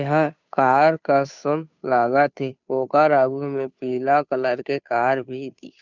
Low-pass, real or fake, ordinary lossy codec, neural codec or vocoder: 7.2 kHz; fake; none; codec, 16 kHz, 2 kbps, FunCodec, trained on Chinese and English, 25 frames a second